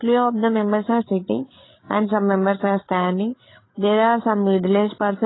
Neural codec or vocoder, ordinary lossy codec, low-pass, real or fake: codec, 16 kHz, 8 kbps, FreqCodec, larger model; AAC, 16 kbps; 7.2 kHz; fake